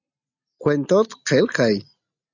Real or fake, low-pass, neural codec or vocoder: real; 7.2 kHz; none